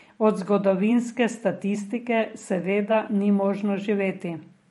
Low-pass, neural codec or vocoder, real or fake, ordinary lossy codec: 19.8 kHz; vocoder, 44.1 kHz, 128 mel bands every 512 samples, BigVGAN v2; fake; MP3, 48 kbps